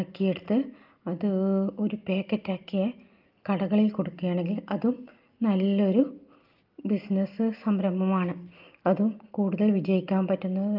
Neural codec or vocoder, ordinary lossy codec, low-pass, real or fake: none; Opus, 24 kbps; 5.4 kHz; real